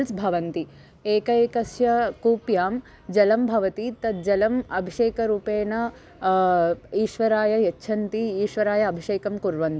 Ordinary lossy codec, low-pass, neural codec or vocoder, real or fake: none; none; none; real